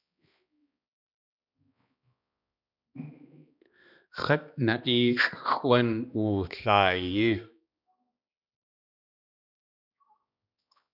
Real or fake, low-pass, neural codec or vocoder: fake; 5.4 kHz; codec, 16 kHz, 2 kbps, X-Codec, HuBERT features, trained on balanced general audio